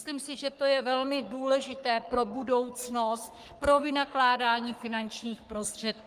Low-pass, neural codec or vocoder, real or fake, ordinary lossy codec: 14.4 kHz; codec, 44.1 kHz, 3.4 kbps, Pupu-Codec; fake; Opus, 32 kbps